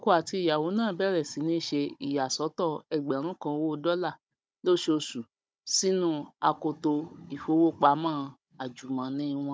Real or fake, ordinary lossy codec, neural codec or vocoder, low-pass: fake; none; codec, 16 kHz, 16 kbps, FunCodec, trained on Chinese and English, 50 frames a second; none